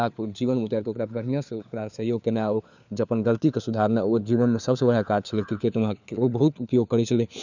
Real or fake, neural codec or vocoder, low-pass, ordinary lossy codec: fake; codec, 16 kHz, 4 kbps, FunCodec, trained on LibriTTS, 50 frames a second; 7.2 kHz; none